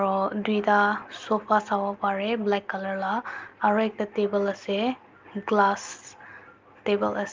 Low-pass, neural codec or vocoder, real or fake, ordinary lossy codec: 7.2 kHz; none; real; Opus, 32 kbps